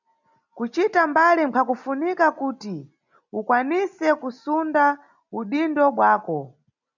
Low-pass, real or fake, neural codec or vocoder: 7.2 kHz; real; none